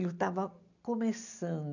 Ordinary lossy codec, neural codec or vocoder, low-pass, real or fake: none; none; 7.2 kHz; real